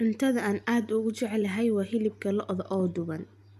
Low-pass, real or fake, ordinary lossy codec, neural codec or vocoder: 14.4 kHz; real; none; none